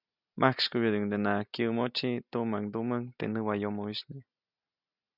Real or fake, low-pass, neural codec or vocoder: real; 5.4 kHz; none